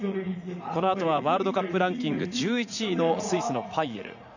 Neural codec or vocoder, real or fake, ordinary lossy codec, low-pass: vocoder, 44.1 kHz, 80 mel bands, Vocos; fake; none; 7.2 kHz